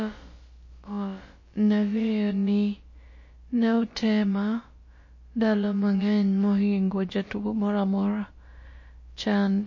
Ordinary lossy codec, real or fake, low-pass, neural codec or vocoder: MP3, 32 kbps; fake; 7.2 kHz; codec, 16 kHz, about 1 kbps, DyCAST, with the encoder's durations